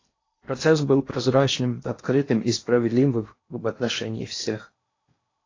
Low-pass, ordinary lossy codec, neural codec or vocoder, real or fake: 7.2 kHz; AAC, 32 kbps; codec, 16 kHz in and 24 kHz out, 0.8 kbps, FocalCodec, streaming, 65536 codes; fake